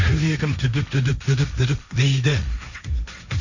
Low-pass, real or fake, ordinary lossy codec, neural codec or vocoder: 7.2 kHz; fake; none; codec, 16 kHz, 1.1 kbps, Voila-Tokenizer